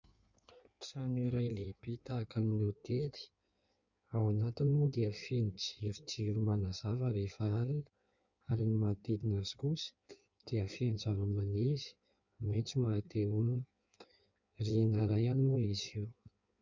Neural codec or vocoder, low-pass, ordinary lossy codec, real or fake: codec, 16 kHz in and 24 kHz out, 1.1 kbps, FireRedTTS-2 codec; 7.2 kHz; Opus, 64 kbps; fake